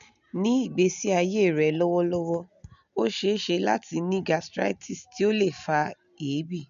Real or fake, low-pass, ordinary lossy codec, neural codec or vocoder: real; 7.2 kHz; none; none